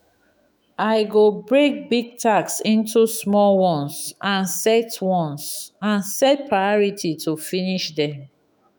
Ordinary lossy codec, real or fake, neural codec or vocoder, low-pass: none; fake; autoencoder, 48 kHz, 128 numbers a frame, DAC-VAE, trained on Japanese speech; none